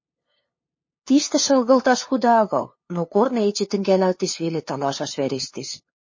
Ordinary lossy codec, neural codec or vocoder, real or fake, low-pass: MP3, 32 kbps; codec, 16 kHz, 2 kbps, FunCodec, trained on LibriTTS, 25 frames a second; fake; 7.2 kHz